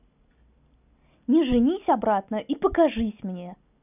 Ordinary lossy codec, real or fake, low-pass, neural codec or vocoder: none; real; 3.6 kHz; none